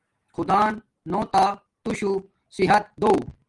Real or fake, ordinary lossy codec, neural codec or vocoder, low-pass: real; Opus, 24 kbps; none; 10.8 kHz